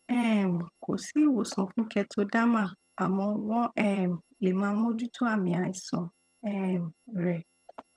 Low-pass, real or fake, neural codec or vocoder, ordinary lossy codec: none; fake; vocoder, 22.05 kHz, 80 mel bands, HiFi-GAN; none